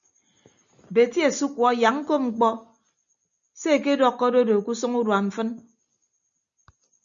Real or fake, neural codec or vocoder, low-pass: real; none; 7.2 kHz